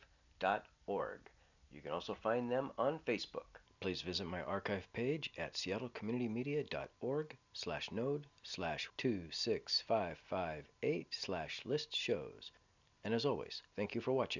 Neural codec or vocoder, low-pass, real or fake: none; 7.2 kHz; real